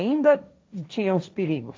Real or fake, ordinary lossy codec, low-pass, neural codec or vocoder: fake; none; none; codec, 16 kHz, 1.1 kbps, Voila-Tokenizer